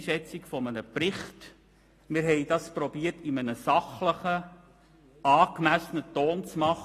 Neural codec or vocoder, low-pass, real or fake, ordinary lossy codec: none; 14.4 kHz; real; AAC, 48 kbps